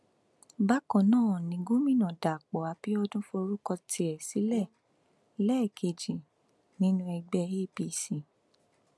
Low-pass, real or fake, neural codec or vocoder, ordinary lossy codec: none; real; none; none